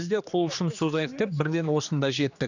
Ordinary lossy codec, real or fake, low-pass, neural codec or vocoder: none; fake; 7.2 kHz; codec, 16 kHz, 2 kbps, X-Codec, HuBERT features, trained on general audio